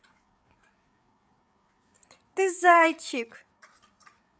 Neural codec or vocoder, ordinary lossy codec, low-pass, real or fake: codec, 16 kHz, 8 kbps, FreqCodec, larger model; none; none; fake